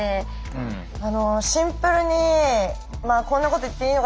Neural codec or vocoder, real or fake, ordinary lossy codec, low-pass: none; real; none; none